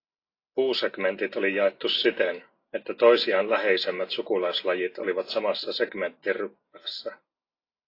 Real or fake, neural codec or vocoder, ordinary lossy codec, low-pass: real; none; AAC, 32 kbps; 5.4 kHz